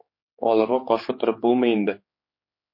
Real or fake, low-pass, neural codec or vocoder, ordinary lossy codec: fake; 5.4 kHz; codec, 16 kHz, 4 kbps, X-Codec, HuBERT features, trained on general audio; MP3, 32 kbps